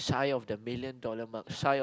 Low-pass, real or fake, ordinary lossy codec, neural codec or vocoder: none; real; none; none